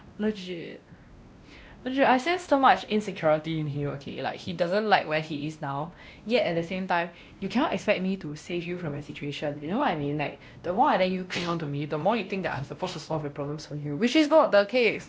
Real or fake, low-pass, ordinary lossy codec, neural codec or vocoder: fake; none; none; codec, 16 kHz, 1 kbps, X-Codec, WavLM features, trained on Multilingual LibriSpeech